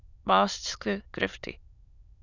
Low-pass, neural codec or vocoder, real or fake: 7.2 kHz; autoencoder, 22.05 kHz, a latent of 192 numbers a frame, VITS, trained on many speakers; fake